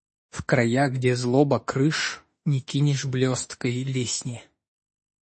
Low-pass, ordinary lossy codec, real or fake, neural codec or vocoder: 10.8 kHz; MP3, 32 kbps; fake; autoencoder, 48 kHz, 32 numbers a frame, DAC-VAE, trained on Japanese speech